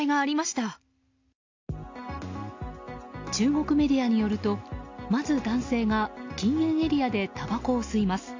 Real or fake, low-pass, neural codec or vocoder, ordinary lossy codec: real; 7.2 kHz; none; AAC, 48 kbps